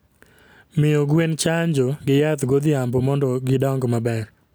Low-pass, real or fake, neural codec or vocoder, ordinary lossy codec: none; real; none; none